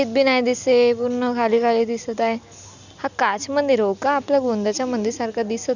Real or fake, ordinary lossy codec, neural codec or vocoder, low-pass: real; none; none; 7.2 kHz